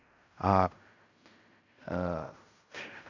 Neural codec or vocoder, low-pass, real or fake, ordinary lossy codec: codec, 16 kHz in and 24 kHz out, 0.4 kbps, LongCat-Audio-Codec, fine tuned four codebook decoder; 7.2 kHz; fake; none